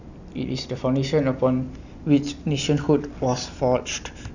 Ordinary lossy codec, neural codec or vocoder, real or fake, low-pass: none; none; real; 7.2 kHz